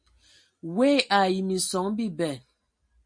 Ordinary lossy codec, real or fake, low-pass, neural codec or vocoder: MP3, 48 kbps; real; 9.9 kHz; none